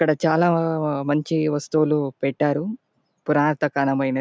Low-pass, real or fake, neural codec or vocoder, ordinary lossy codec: none; real; none; none